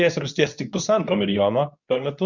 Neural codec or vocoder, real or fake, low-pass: codec, 24 kHz, 0.9 kbps, WavTokenizer, medium speech release version 2; fake; 7.2 kHz